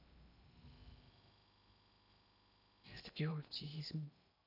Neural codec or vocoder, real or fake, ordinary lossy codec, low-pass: codec, 16 kHz in and 24 kHz out, 0.8 kbps, FocalCodec, streaming, 65536 codes; fake; none; 5.4 kHz